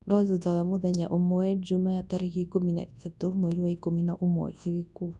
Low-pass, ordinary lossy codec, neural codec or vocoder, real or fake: 10.8 kHz; none; codec, 24 kHz, 0.9 kbps, WavTokenizer, large speech release; fake